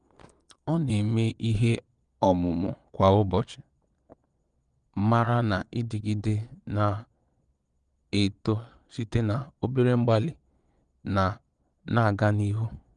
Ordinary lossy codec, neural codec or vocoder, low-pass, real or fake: Opus, 32 kbps; vocoder, 22.05 kHz, 80 mel bands, Vocos; 9.9 kHz; fake